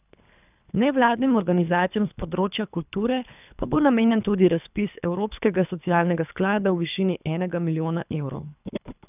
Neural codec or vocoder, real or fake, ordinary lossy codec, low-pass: codec, 24 kHz, 3 kbps, HILCodec; fake; none; 3.6 kHz